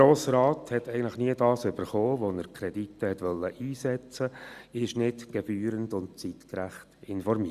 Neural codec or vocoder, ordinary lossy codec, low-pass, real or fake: none; Opus, 64 kbps; 14.4 kHz; real